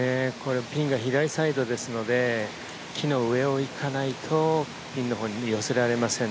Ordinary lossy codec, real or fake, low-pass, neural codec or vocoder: none; real; none; none